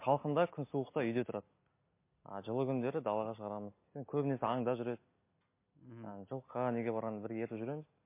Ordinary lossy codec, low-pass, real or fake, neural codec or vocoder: MP3, 24 kbps; 3.6 kHz; real; none